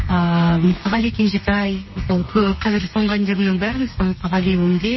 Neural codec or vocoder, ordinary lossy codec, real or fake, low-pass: codec, 32 kHz, 1.9 kbps, SNAC; MP3, 24 kbps; fake; 7.2 kHz